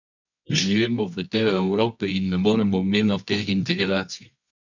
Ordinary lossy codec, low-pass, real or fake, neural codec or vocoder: none; 7.2 kHz; fake; codec, 24 kHz, 0.9 kbps, WavTokenizer, medium music audio release